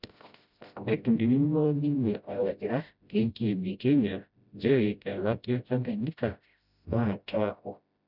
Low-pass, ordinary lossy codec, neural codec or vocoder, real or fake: 5.4 kHz; none; codec, 16 kHz, 0.5 kbps, FreqCodec, smaller model; fake